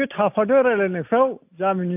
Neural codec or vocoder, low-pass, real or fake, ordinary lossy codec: none; 3.6 kHz; real; AAC, 32 kbps